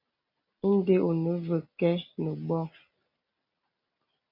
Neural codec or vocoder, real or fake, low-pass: none; real; 5.4 kHz